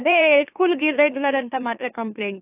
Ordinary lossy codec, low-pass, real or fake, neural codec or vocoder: AAC, 16 kbps; 3.6 kHz; fake; autoencoder, 44.1 kHz, a latent of 192 numbers a frame, MeloTTS